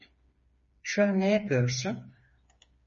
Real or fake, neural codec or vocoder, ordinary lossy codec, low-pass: fake; codec, 16 kHz, 4 kbps, FreqCodec, smaller model; MP3, 32 kbps; 7.2 kHz